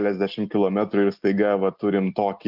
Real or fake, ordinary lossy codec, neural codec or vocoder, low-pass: real; Opus, 32 kbps; none; 5.4 kHz